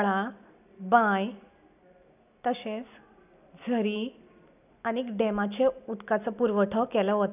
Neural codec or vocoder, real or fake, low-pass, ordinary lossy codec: none; real; 3.6 kHz; none